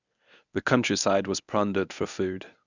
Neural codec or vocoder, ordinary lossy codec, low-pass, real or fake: codec, 24 kHz, 0.9 kbps, WavTokenizer, medium speech release version 1; none; 7.2 kHz; fake